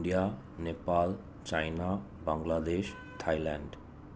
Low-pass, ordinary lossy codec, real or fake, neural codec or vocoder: none; none; real; none